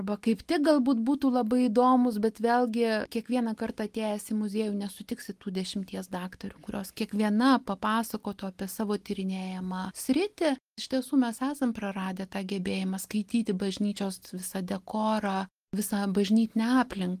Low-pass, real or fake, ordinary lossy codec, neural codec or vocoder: 14.4 kHz; real; Opus, 24 kbps; none